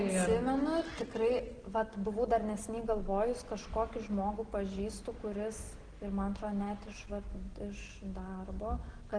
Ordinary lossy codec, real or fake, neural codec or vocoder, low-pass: Opus, 16 kbps; real; none; 9.9 kHz